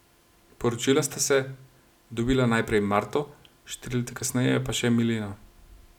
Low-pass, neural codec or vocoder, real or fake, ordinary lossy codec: 19.8 kHz; none; real; none